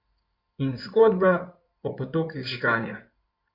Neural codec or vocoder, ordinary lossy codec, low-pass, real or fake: codec, 16 kHz in and 24 kHz out, 2.2 kbps, FireRedTTS-2 codec; MP3, 48 kbps; 5.4 kHz; fake